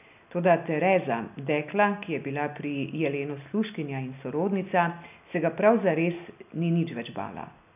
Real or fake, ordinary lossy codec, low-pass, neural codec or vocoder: real; none; 3.6 kHz; none